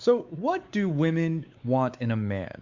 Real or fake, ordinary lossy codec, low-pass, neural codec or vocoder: fake; Opus, 64 kbps; 7.2 kHz; codec, 16 kHz, 4 kbps, X-Codec, WavLM features, trained on Multilingual LibriSpeech